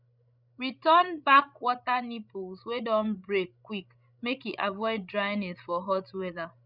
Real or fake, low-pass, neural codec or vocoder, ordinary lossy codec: fake; 5.4 kHz; codec, 16 kHz, 16 kbps, FreqCodec, larger model; none